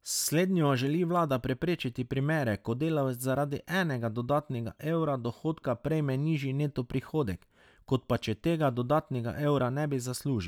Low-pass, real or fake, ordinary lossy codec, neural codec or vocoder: 19.8 kHz; real; none; none